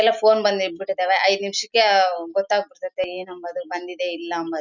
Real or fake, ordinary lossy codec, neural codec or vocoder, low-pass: real; none; none; 7.2 kHz